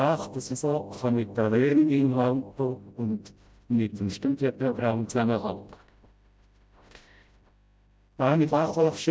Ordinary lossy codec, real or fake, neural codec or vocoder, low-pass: none; fake; codec, 16 kHz, 0.5 kbps, FreqCodec, smaller model; none